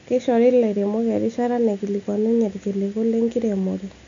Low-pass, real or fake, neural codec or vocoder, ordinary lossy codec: 7.2 kHz; real; none; MP3, 64 kbps